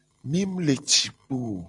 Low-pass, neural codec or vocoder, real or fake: 10.8 kHz; none; real